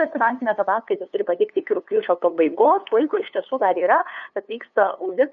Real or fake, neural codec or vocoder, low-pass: fake; codec, 16 kHz, 2 kbps, FunCodec, trained on LibriTTS, 25 frames a second; 7.2 kHz